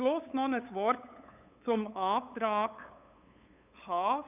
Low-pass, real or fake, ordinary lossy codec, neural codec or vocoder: 3.6 kHz; fake; none; codec, 16 kHz, 8 kbps, FunCodec, trained on LibriTTS, 25 frames a second